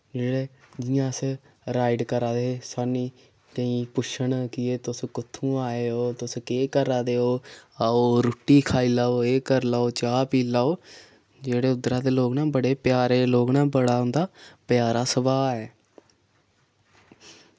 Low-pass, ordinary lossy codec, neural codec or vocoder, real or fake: none; none; none; real